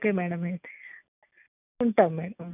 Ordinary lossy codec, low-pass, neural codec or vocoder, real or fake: none; 3.6 kHz; none; real